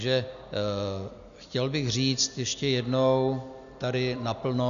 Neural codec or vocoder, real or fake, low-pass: none; real; 7.2 kHz